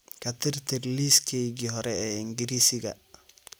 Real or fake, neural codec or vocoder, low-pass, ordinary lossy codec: real; none; none; none